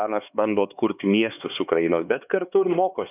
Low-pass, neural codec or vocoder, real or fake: 3.6 kHz; codec, 16 kHz, 4 kbps, X-Codec, HuBERT features, trained on LibriSpeech; fake